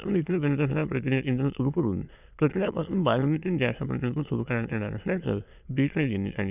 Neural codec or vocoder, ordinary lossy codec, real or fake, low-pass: autoencoder, 22.05 kHz, a latent of 192 numbers a frame, VITS, trained on many speakers; none; fake; 3.6 kHz